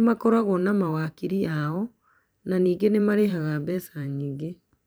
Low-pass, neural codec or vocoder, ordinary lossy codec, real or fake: none; vocoder, 44.1 kHz, 128 mel bands every 512 samples, BigVGAN v2; none; fake